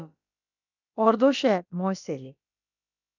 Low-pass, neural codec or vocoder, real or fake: 7.2 kHz; codec, 16 kHz, about 1 kbps, DyCAST, with the encoder's durations; fake